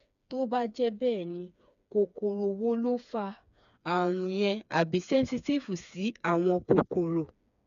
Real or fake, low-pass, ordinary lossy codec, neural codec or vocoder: fake; 7.2 kHz; none; codec, 16 kHz, 4 kbps, FreqCodec, smaller model